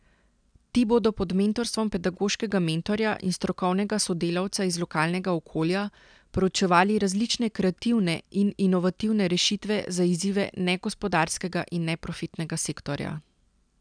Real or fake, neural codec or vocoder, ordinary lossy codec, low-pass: real; none; none; 9.9 kHz